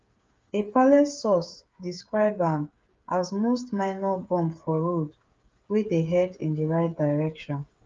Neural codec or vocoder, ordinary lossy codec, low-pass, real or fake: codec, 16 kHz, 8 kbps, FreqCodec, smaller model; Opus, 32 kbps; 7.2 kHz; fake